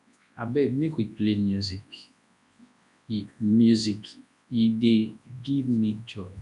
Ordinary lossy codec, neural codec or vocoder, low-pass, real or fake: MP3, 96 kbps; codec, 24 kHz, 0.9 kbps, WavTokenizer, large speech release; 10.8 kHz; fake